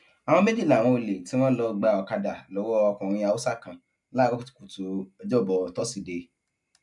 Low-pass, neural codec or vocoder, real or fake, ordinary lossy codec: 10.8 kHz; none; real; none